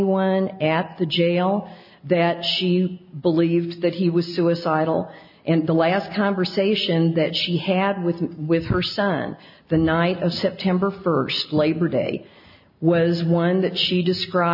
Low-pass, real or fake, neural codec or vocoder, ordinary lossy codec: 5.4 kHz; real; none; MP3, 48 kbps